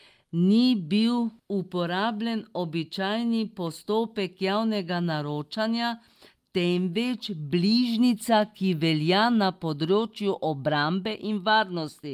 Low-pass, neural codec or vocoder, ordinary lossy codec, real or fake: 14.4 kHz; none; Opus, 32 kbps; real